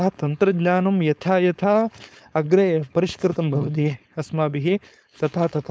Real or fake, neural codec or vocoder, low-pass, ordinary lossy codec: fake; codec, 16 kHz, 4.8 kbps, FACodec; none; none